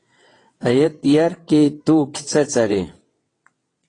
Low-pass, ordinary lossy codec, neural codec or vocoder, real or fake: 9.9 kHz; AAC, 32 kbps; vocoder, 22.05 kHz, 80 mel bands, WaveNeXt; fake